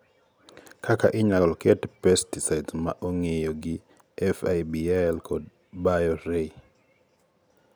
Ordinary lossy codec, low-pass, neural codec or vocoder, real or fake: none; none; none; real